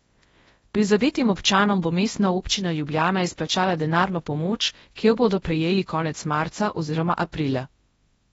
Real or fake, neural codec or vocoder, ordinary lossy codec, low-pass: fake; codec, 24 kHz, 0.9 kbps, WavTokenizer, large speech release; AAC, 24 kbps; 10.8 kHz